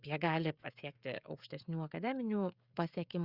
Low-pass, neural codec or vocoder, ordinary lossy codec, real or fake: 5.4 kHz; codec, 16 kHz, 16 kbps, FreqCodec, smaller model; Opus, 64 kbps; fake